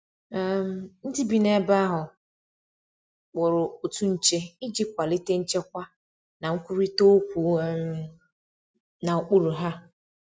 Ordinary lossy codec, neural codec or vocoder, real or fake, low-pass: none; none; real; none